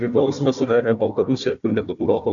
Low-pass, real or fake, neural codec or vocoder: 7.2 kHz; fake; codec, 16 kHz, 1 kbps, FunCodec, trained on Chinese and English, 50 frames a second